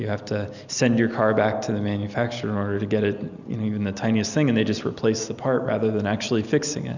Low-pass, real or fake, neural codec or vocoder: 7.2 kHz; real; none